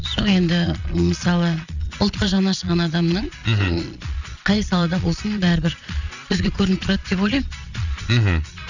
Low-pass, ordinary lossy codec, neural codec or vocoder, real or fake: 7.2 kHz; none; vocoder, 22.05 kHz, 80 mel bands, Vocos; fake